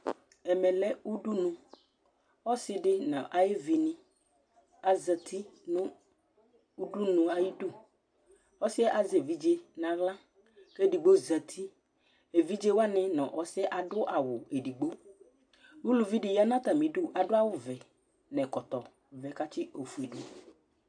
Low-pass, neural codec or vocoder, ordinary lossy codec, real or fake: 9.9 kHz; none; AAC, 64 kbps; real